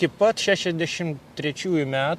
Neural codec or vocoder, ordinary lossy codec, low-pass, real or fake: none; MP3, 64 kbps; 14.4 kHz; real